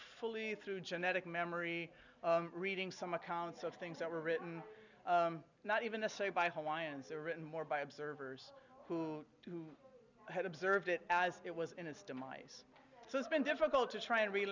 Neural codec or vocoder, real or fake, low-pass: none; real; 7.2 kHz